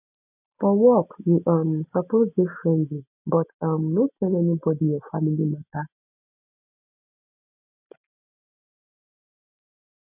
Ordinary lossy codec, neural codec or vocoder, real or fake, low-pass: none; none; real; 3.6 kHz